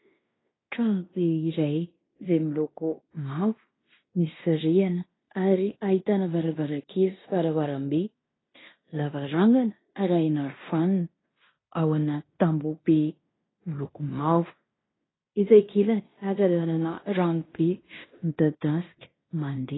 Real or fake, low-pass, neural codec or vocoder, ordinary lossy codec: fake; 7.2 kHz; codec, 16 kHz in and 24 kHz out, 0.9 kbps, LongCat-Audio-Codec, fine tuned four codebook decoder; AAC, 16 kbps